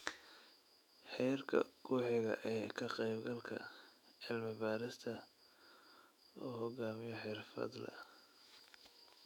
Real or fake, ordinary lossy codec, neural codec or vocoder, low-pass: fake; none; autoencoder, 48 kHz, 128 numbers a frame, DAC-VAE, trained on Japanese speech; 19.8 kHz